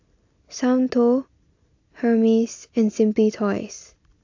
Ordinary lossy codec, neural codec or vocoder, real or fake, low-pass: AAC, 48 kbps; none; real; 7.2 kHz